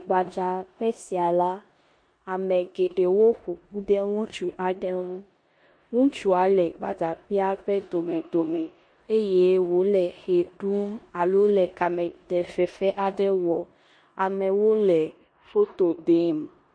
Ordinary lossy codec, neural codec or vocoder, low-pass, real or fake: MP3, 48 kbps; codec, 16 kHz in and 24 kHz out, 0.9 kbps, LongCat-Audio-Codec, four codebook decoder; 9.9 kHz; fake